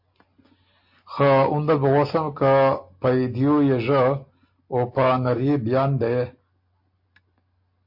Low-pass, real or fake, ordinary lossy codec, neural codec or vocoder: 5.4 kHz; real; MP3, 32 kbps; none